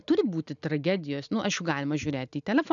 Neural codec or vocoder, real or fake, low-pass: none; real; 7.2 kHz